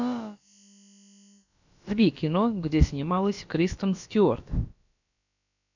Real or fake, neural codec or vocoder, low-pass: fake; codec, 16 kHz, about 1 kbps, DyCAST, with the encoder's durations; 7.2 kHz